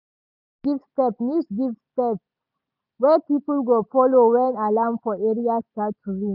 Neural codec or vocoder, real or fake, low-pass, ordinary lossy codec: none; real; 5.4 kHz; none